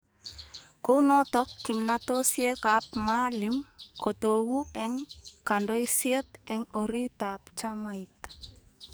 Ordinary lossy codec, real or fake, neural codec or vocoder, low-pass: none; fake; codec, 44.1 kHz, 2.6 kbps, SNAC; none